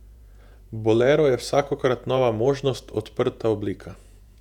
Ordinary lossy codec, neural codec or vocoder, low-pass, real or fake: none; vocoder, 48 kHz, 128 mel bands, Vocos; 19.8 kHz; fake